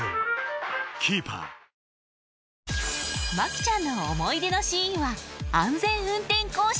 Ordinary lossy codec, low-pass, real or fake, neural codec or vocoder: none; none; real; none